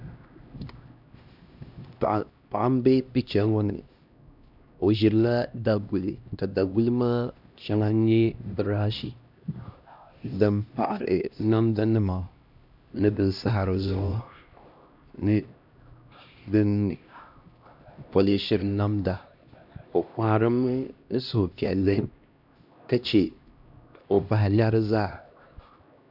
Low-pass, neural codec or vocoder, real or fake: 5.4 kHz; codec, 16 kHz, 1 kbps, X-Codec, HuBERT features, trained on LibriSpeech; fake